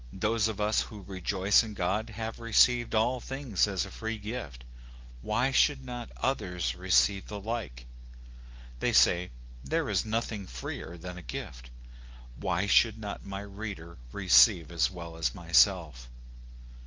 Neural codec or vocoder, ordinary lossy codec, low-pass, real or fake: none; Opus, 32 kbps; 7.2 kHz; real